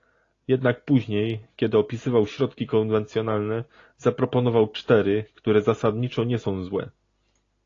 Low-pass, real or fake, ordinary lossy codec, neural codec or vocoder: 7.2 kHz; real; AAC, 32 kbps; none